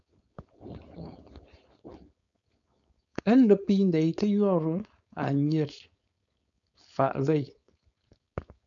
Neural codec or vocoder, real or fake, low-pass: codec, 16 kHz, 4.8 kbps, FACodec; fake; 7.2 kHz